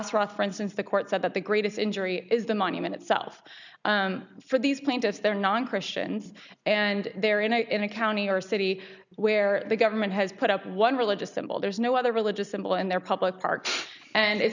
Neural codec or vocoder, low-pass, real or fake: none; 7.2 kHz; real